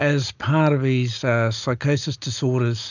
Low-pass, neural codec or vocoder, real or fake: 7.2 kHz; none; real